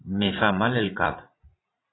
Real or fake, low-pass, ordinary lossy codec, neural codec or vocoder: fake; 7.2 kHz; AAC, 16 kbps; vocoder, 44.1 kHz, 80 mel bands, Vocos